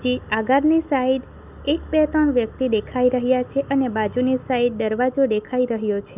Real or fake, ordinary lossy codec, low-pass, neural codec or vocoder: real; none; 3.6 kHz; none